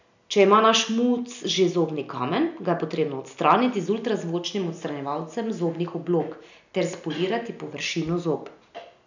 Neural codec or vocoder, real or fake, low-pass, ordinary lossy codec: none; real; 7.2 kHz; none